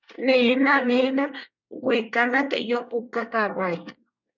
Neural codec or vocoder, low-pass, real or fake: codec, 24 kHz, 1 kbps, SNAC; 7.2 kHz; fake